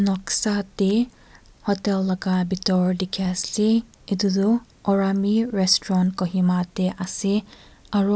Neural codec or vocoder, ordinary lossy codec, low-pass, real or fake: none; none; none; real